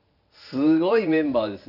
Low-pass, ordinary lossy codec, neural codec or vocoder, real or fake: 5.4 kHz; none; vocoder, 44.1 kHz, 128 mel bands every 512 samples, BigVGAN v2; fake